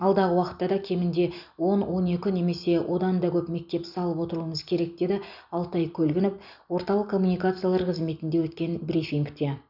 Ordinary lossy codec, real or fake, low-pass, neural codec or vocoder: none; real; 5.4 kHz; none